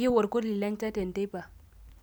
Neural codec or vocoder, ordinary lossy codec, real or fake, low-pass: none; none; real; none